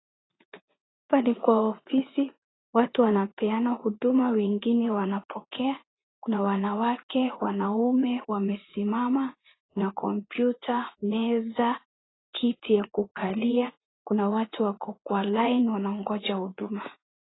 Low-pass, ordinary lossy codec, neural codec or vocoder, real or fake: 7.2 kHz; AAC, 16 kbps; vocoder, 44.1 kHz, 80 mel bands, Vocos; fake